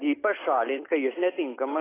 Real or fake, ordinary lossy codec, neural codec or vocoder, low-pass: real; AAC, 16 kbps; none; 3.6 kHz